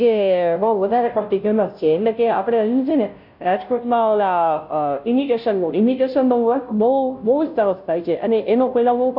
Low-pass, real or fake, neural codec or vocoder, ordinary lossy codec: 5.4 kHz; fake; codec, 16 kHz, 0.5 kbps, FunCodec, trained on Chinese and English, 25 frames a second; none